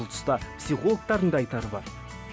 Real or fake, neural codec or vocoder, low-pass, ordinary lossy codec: real; none; none; none